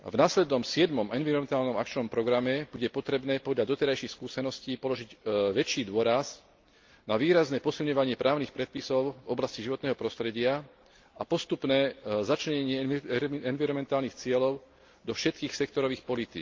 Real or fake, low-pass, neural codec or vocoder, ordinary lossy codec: real; 7.2 kHz; none; Opus, 24 kbps